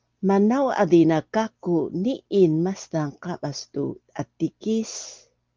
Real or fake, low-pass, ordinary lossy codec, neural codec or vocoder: real; 7.2 kHz; Opus, 32 kbps; none